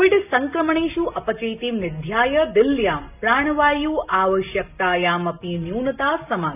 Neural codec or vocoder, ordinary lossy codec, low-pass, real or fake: none; none; 3.6 kHz; real